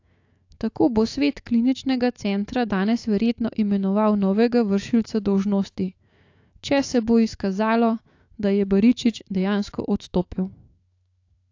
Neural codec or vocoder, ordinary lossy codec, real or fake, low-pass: autoencoder, 48 kHz, 128 numbers a frame, DAC-VAE, trained on Japanese speech; AAC, 48 kbps; fake; 7.2 kHz